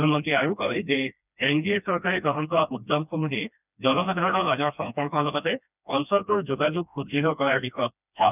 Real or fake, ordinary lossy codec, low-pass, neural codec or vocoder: fake; none; 3.6 kHz; codec, 16 kHz, 1 kbps, FreqCodec, smaller model